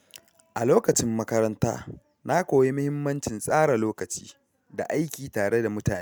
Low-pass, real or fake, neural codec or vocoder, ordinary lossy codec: none; real; none; none